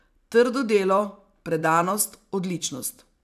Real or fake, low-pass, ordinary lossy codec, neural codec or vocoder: fake; 14.4 kHz; none; vocoder, 44.1 kHz, 128 mel bands every 256 samples, BigVGAN v2